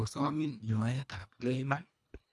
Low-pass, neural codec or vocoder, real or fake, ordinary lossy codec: none; codec, 24 kHz, 1.5 kbps, HILCodec; fake; none